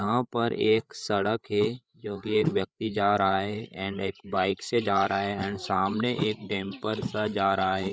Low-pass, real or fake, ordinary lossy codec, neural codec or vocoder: none; fake; none; codec, 16 kHz, 8 kbps, FreqCodec, larger model